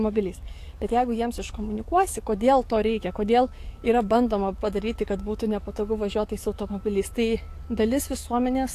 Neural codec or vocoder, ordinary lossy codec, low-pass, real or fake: codec, 44.1 kHz, 7.8 kbps, DAC; AAC, 64 kbps; 14.4 kHz; fake